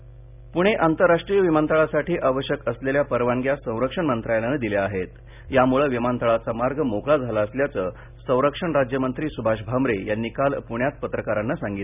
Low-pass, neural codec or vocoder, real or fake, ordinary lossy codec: 3.6 kHz; none; real; none